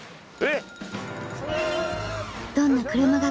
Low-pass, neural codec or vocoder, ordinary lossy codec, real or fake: none; none; none; real